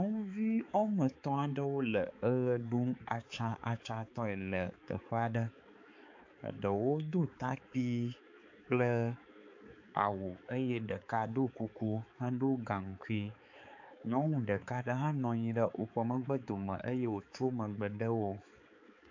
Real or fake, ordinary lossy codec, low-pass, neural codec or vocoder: fake; AAC, 48 kbps; 7.2 kHz; codec, 16 kHz, 4 kbps, X-Codec, HuBERT features, trained on balanced general audio